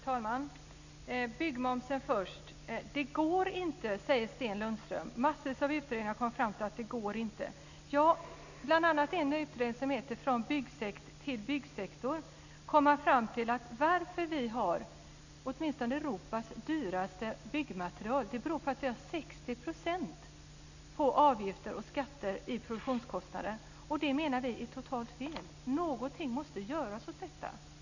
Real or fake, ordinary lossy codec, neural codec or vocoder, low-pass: real; none; none; 7.2 kHz